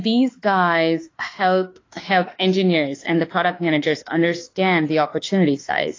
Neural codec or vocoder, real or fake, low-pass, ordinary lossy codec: autoencoder, 48 kHz, 32 numbers a frame, DAC-VAE, trained on Japanese speech; fake; 7.2 kHz; AAC, 32 kbps